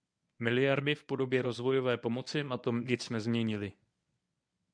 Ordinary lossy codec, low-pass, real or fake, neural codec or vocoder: MP3, 96 kbps; 9.9 kHz; fake; codec, 24 kHz, 0.9 kbps, WavTokenizer, medium speech release version 1